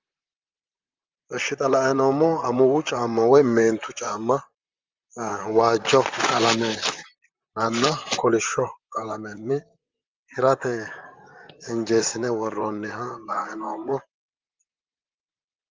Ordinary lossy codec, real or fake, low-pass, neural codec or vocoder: Opus, 32 kbps; fake; 7.2 kHz; vocoder, 44.1 kHz, 128 mel bands, Pupu-Vocoder